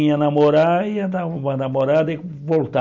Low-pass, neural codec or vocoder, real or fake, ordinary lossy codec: 7.2 kHz; none; real; none